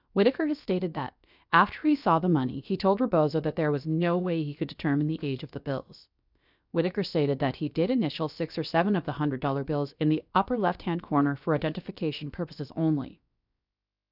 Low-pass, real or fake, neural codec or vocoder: 5.4 kHz; fake; codec, 16 kHz, about 1 kbps, DyCAST, with the encoder's durations